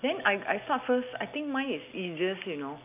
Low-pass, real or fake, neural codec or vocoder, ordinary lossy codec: 3.6 kHz; real; none; AAC, 32 kbps